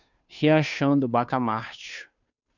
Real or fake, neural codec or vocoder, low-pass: fake; codec, 16 kHz, 2 kbps, FunCodec, trained on Chinese and English, 25 frames a second; 7.2 kHz